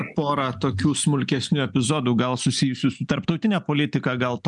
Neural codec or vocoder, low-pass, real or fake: none; 10.8 kHz; real